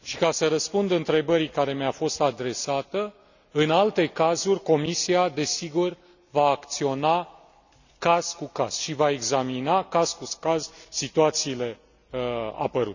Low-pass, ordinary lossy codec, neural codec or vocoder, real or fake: 7.2 kHz; none; none; real